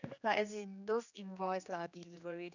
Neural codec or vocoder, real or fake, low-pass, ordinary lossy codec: codec, 16 kHz, 2 kbps, X-Codec, HuBERT features, trained on general audio; fake; 7.2 kHz; none